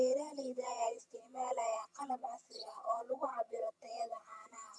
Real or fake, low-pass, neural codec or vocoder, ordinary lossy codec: fake; none; vocoder, 22.05 kHz, 80 mel bands, HiFi-GAN; none